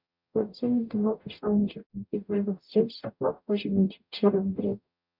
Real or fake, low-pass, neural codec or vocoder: fake; 5.4 kHz; codec, 44.1 kHz, 0.9 kbps, DAC